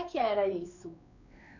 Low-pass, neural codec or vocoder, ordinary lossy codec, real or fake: 7.2 kHz; codec, 16 kHz, 4 kbps, X-Codec, WavLM features, trained on Multilingual LibriSpeech; none; fake